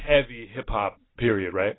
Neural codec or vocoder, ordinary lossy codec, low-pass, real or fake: none; AAC, 16 kbps; 7.2 kHz; real